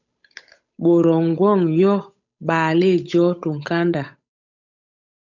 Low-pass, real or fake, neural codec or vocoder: 7.2 kHz; fake; codec, 16 kHz, 8 kbps, FunCodec, trained on Chinese and English, 25 frames a second